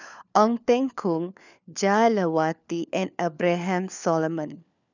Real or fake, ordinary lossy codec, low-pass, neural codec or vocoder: fake; none; 7.2 kHz; codec, 16 kHz, 4 kbps, FunCodec, trained on LibriTTS, 50 frames a second